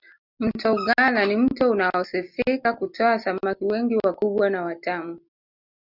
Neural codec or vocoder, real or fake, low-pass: none; real; 5.4 kHz